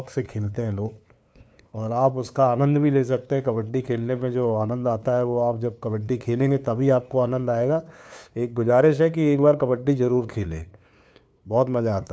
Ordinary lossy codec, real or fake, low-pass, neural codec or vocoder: none; fake; none; codec, 16 kHz, 2 kbps, FunCodec, trained on LibriTTS, 25 frames a second